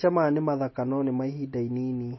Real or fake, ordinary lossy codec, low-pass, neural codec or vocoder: real; MP3, 24 kbps; 7.2 kHz; none